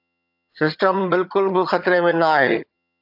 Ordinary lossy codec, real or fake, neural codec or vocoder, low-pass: AAC, 48 kbps; fake; vocoder, 22.05 kHz, 80 mel bands, HiFi-GAN; 5.4 kHz